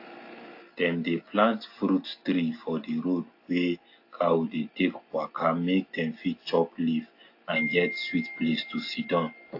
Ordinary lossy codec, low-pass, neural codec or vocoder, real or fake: AAC, 32 kbps; 5.4 kHz; none; real